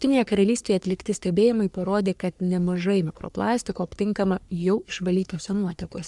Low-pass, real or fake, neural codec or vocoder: 10.8 kHz; fake; codec, 44.1 kHz, 3.4 kbps, Pupu-Codec